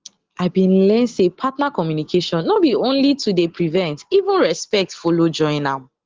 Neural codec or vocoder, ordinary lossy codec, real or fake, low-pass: none; Opus, 16 kbps; real; 7.2 kHz